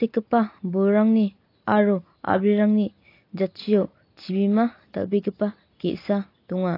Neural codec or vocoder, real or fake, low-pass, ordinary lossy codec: none; real; 5.4 kHz; AAC, 32 kbps